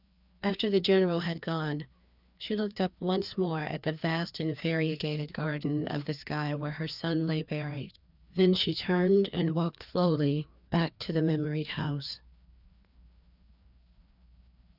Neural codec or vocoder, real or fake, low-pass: codec, 16 kHz, 2 kbps, FreqCodec, larger model; fake; 5.4 kHz